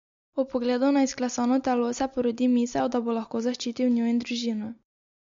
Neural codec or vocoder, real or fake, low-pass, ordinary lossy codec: none; real; 7.2 kHz; MP3, 48 kbps